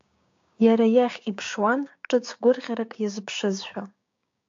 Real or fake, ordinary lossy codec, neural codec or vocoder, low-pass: fake; AAC, 64 kbps; codec, 16 kHz, 6 kbps, DAC; 7.2 kHz